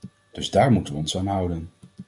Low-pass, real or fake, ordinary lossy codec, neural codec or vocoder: 10.8 kHz; real; MP3, 64 kbps; none